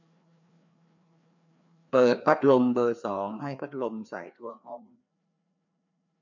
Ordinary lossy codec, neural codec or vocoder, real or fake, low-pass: none; codec, 16 kHz, 2 kbps, FreqCodec, larger model; fake; 7.2 kHz